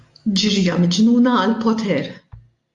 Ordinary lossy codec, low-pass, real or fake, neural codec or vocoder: AAC, 64 kbps; 10.8 kHz; real; none